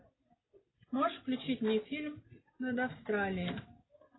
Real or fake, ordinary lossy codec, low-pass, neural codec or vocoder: real; AAC, 16 kbps; 7.2 kHz; none